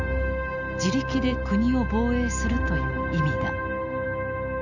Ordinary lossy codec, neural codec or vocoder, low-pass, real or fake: none; none; 7.2 kHz; real